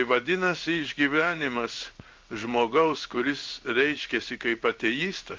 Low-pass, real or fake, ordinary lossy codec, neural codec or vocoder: 7.2 kHz; fake; Opus, 24 kbps; codec, 16 kHz in and 24 kHz out, 1 kbps, XY-Tokenizer